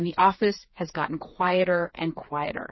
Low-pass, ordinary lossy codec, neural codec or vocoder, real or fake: 7.2 kHz; MP3, 24 kbps; codec, 16 kHz, 4 kbps, FreqCodec, smaller model; fake